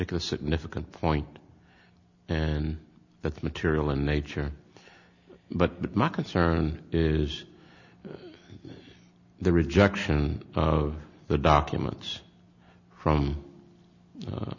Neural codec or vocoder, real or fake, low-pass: none; real; 7.2 kHz